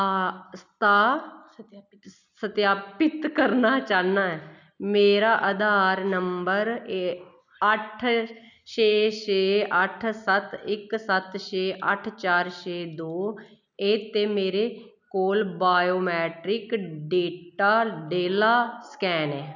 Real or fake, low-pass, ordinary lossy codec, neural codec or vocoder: real; 7.2 kHz; none; none